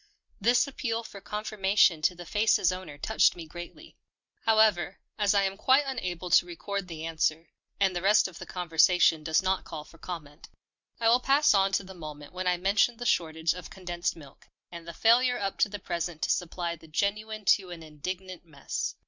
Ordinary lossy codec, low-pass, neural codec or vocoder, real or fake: Opus, 64 kbps; 7.2 kHz; none; real